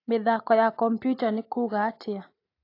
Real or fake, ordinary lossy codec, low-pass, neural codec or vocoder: real; AAC, 32 kbps; 5.4 kHz; none